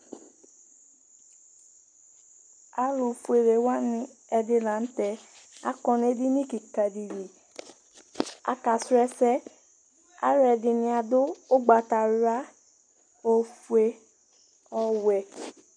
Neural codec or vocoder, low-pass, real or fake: none; 9.9 kHz; real